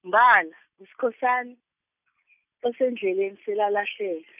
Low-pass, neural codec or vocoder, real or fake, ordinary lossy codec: 3.6 kHz; none; real; none